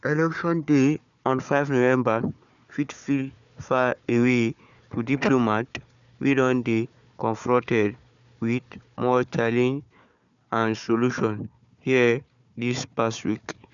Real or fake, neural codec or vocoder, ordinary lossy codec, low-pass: fake; codec, 16 kHz, 4 kbps, FunCodec, trained on Chinese and English, 50 frames a second; none; 7.2 kHz